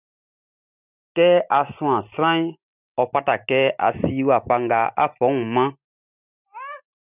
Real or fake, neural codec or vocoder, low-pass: real; none; 3.6 kHz